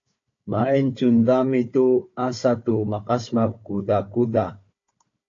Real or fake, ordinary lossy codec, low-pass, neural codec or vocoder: fake; AAC, 48 kbps; 7.2 kHz; codec, 16 kHz, 4 kbps, FunCodec, trained on Chinese and English, 50 frames a second